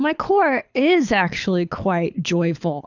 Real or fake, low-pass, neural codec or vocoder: fake; 7.2 kHz; codec, 24 kHz, 6 kbps, HILCodec